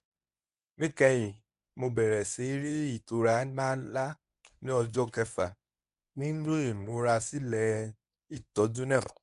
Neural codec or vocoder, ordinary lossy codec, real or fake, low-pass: codec, 24 kHz, 0.9 kbps, WavTokenizer, medium speech release version 1; none; fake; 10.8 kHz